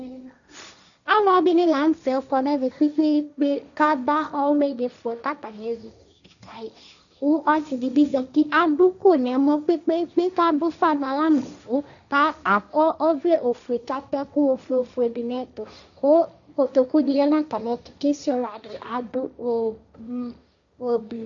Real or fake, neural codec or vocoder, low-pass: fake; codec, 16 kHz, 1.1 kbps, Voila-Tokenizer; 7.2 kHz